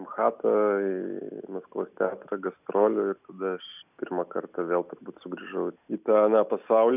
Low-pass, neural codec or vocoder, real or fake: 3.6 kHz; none; real